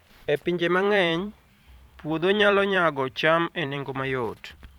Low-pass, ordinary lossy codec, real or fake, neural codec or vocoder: 19.8 kHz; none; fake; vocoder, 44.1 kHz, 128 mel bands every 512 samples, BigVGAN v2